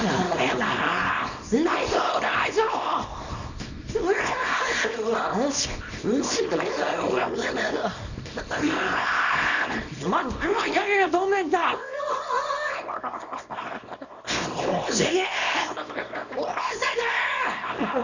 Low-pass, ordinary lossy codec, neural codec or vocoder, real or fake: 7.2 kHz; none; codec, 24 kHz, 0.9 kbps, WavTokenizer, small release; fake